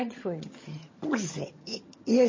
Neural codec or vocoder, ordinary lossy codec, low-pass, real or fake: vocoder, 22.05 kHz, 80 mel bands, HiFi-GAN; MP3, 32 kbps; 7.2 kHz; fake